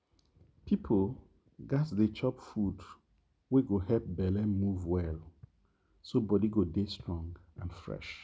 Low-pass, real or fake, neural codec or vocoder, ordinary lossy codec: none; real; none; none